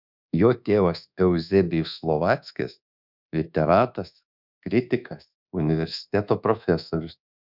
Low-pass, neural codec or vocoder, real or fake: 5.4 kHz; codec, 24 kHz, 1.2 kbps, DualCodec; fake